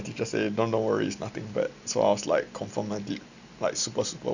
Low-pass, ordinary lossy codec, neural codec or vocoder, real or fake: 7.2 kHz; none; none; real